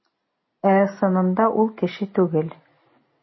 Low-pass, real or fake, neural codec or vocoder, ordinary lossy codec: 7.2 kHz; real; none; MP3, 24 kbps